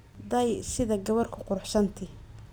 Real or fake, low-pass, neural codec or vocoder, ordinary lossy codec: real; none; none; none